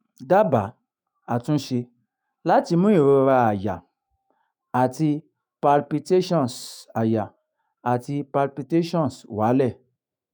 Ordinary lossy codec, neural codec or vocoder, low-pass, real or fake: none; autoencoder, 48 kHz, 128 numbers a frame, DAC-VAE, trained on Japanese speech; none; fake